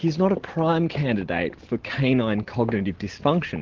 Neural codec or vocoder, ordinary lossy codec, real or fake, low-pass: none; Opus, 16 kbps; real; 7.2 kHz